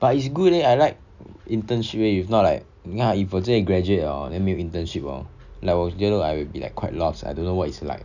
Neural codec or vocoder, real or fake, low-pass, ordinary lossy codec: none; real; 7.2 kHz; none